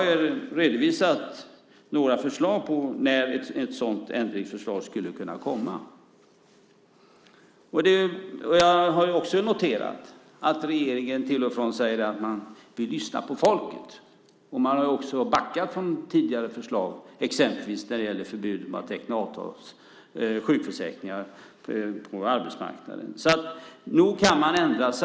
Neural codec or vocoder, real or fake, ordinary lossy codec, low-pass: none; real; none; none